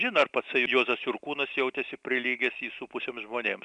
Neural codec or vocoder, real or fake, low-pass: none; real; 9.9 kHz